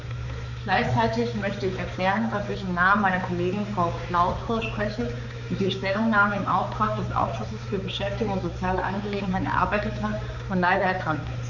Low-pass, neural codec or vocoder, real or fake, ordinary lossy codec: 7.2 kHz; codec, 16 kHz, 4 kbps, X-Codec, HuBERT features, trained on balanced general audio; fake; none